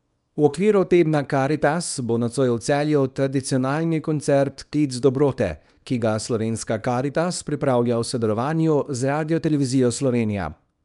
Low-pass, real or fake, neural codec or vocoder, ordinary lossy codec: 10.8 kHz; fake; codec, 24 kHz, 0.9 kbps, WavTokenizer, small release; none